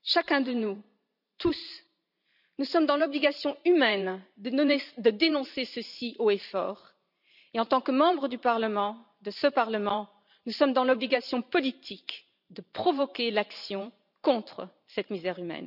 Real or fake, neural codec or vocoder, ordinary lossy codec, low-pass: fake; vocoder, 44.1 kHz, 128 mel bands every 512 samples, BigVGAN v2; none; 5.4 kHz